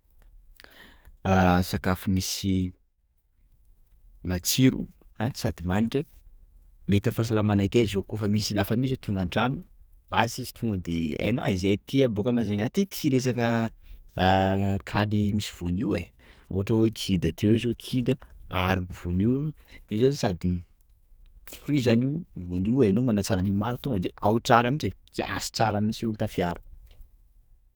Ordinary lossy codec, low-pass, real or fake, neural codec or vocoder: none; none; fake; codec, 44.1 kHz, 2.6 kbps, SNAC